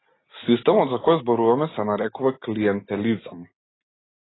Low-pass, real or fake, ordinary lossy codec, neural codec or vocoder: 7.2 kHz; real; AAC, 16 kbps; none